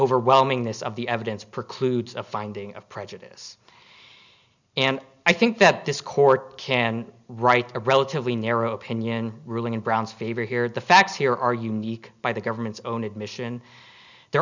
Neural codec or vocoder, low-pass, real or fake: none; 7.2 kHz; real